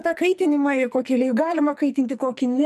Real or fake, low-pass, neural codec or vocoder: fake; 14.4 kHz; codec, 44.1 kHz, 2.6 kbps, SNAC